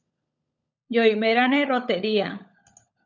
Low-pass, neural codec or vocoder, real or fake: 7.2 kHz; codec, 16 kHz, 16 kbps, FunCodec, trained on LibriTTS, 50 frames a second; fake